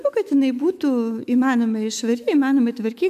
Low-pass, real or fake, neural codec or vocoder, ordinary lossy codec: 14.4 kHz; fake; autoencoder, 48 kHz, 128 numbers a frame, DAC-VAE, trained on Japanese speech; MP3, 96 kbps